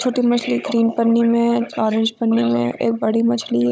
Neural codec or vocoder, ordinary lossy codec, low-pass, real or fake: codec, 16 kHz, 16 kbps, FunCodec, trained on Chinese and English, 50 frames a second; none; none; fake